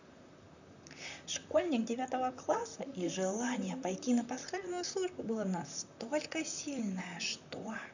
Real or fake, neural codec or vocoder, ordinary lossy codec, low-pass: fake; vocoder, 44.1 kHz, 128 mel bands, Pupu-Vocoder; none; 7.2 kHz